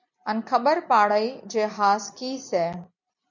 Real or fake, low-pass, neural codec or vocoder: real; 7.2 kHz; none